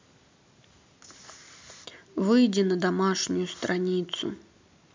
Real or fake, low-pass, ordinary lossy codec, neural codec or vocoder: real; 7.2 kHz; MP3, 64 kbps; none